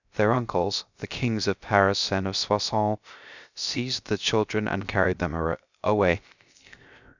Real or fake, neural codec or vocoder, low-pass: fake; codec, 16 kHz, 0.3 kbps, FocalCodec; 7.2 kHz